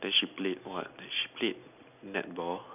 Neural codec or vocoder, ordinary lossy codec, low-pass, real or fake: none; none; 3.6 kHz; real